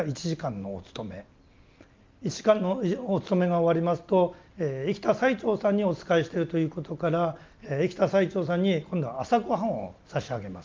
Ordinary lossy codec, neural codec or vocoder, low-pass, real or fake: Opus, 24 kbps; none; 7.2 kHz; real